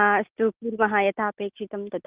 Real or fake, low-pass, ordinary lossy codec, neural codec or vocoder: real; 3.6 kHz; Opus, 24 kbps; none